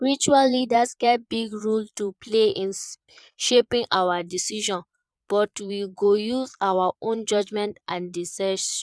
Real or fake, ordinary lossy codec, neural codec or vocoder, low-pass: fake; none; vocoder, 22.05 kHz, 80 mel bands, Vocos; none